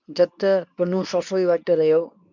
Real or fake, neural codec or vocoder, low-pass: fake; codec, 24 kHz, 0.9 kbps, WavTokenizer, medium speech release version 2; 7.2 kHz